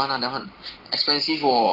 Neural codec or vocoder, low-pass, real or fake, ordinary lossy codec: none; 5.4 kHz; real; Opus, 16 kbps